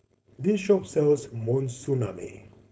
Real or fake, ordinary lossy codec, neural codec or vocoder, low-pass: fake; none; codec, 16 kHz, 4.8 kbps, FACodec; none